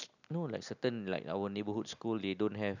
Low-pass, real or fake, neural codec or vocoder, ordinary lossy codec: 7.2 kHz; real; none; none